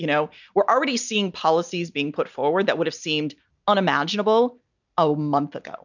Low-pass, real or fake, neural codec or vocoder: 7.2 kHz; real; none